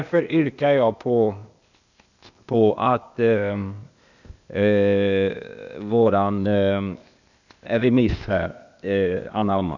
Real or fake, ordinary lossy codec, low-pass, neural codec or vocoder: fake; none; 7.2 kHz; codec, 16 kHz, 0.8 kbps, ZipCodec